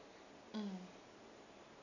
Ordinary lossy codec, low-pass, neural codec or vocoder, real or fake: none; 7.2 kHz; none; real